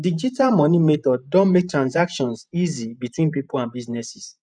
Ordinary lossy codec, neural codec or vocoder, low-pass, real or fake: none; vocoder, 48 kHz, 128 mel bands, Vocos; 9.9 kHz; fake